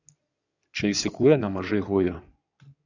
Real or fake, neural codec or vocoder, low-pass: fake; codec, 16 kHz in and 24 kHz out, 2.2 kbps, FireRedTTS-2 codec; 7.2 kHz